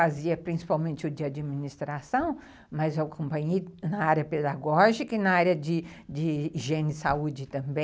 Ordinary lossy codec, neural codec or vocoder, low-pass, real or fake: none; none; none; real